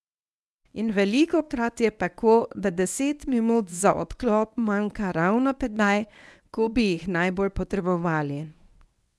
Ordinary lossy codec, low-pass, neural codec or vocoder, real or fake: none; none; codec, 24 kHz, 0.9 kbps, WavTokenizer, medium speech release version 1; fake